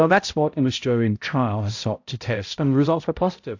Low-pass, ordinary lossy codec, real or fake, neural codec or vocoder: 7.2 kHz; AAC, 48 kbps; fake; codec, 16 kHz, 0.5 kbps, X-Codec, HuBERT features, trained on balanced general audio